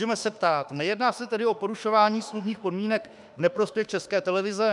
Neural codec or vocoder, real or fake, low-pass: autoencoder, 48 kHz, 32 numbers a frame, DAC-VAE, trained on Japanese speech; fake; 10.8 kHz